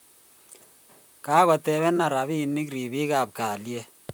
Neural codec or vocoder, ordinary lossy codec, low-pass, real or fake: vocoder, 44.1 kHz, 128 mel bands, Pupu-Vocoder; none; none; fake